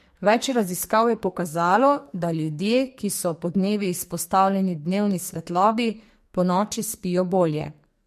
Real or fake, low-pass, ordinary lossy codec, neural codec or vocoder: fake; 14.4 kHz; MP3, 64 kbps; codec, 44.1 kHz, 2.6 kbps, SNAC